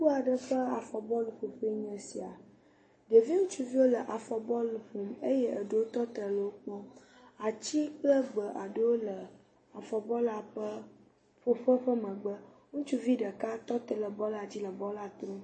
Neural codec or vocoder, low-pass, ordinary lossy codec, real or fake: none; 9.9 kHz; MP3, 32 kbps; real